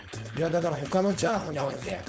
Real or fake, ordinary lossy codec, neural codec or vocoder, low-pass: fake; none; codec, 16 kHz, 4.8 kbps, FACodec; none